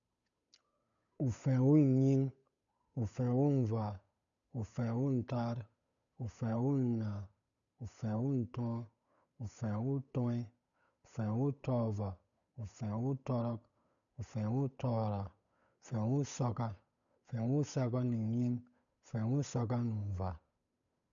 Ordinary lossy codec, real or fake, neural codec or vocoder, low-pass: none; fake; codec, 16 kHz, 8 kbps, FunCodec, trained on Chinese and English, 25 frames a second; 7.2 kHz